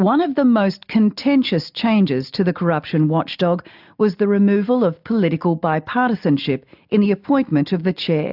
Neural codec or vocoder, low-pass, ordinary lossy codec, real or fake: none; 5.4 kHz; AAC, 48 kbps; real